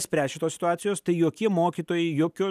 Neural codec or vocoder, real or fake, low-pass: none; real; 14.4 kHz